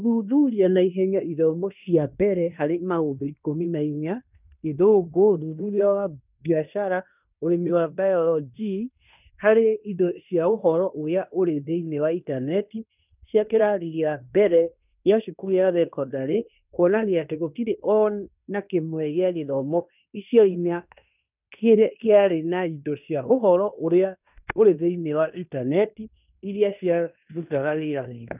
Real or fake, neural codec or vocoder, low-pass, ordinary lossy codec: fake; codec, 16 kHz in and 24 kHz out, 0.9 kbps, LongCat-Audio-Codec, fine tuned four codebook decoder; 3.6 kHz; none